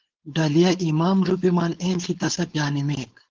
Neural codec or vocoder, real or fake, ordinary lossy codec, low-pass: codec, 16 kHz, 4.8 kbps, FACodec; fake; Opus, 16 kbps; 7.2 kHz